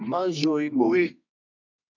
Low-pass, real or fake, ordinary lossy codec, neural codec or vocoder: 7.2 kHz; fake; MP3, 64 kbps; codec, 24 kHz, 0.9 kbps, WavTokenizer, medium music audio release